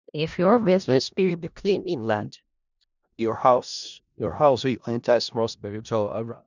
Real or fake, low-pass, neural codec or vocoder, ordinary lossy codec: fake; 7.2 kHz; codec, 16 kHz in and 24 kHz out, 0.4 kbps, LongCat-Audio-Codec, four codebook decoder; none